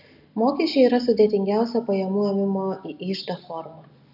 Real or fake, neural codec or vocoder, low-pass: real; none; 5.4 kHz